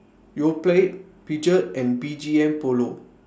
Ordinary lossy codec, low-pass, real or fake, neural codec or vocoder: none; none; real; none